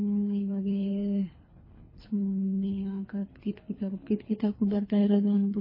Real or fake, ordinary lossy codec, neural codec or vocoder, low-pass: fake; MP3, 24 kbps; codec, 24 kHz, 3 kbps, HILCodec; 5.4 kHz